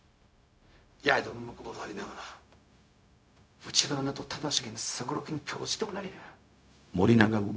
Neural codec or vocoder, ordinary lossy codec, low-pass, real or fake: codec, 16 kHz, 0.4 kbps, LongCat-Audio-Codec; none; none; fake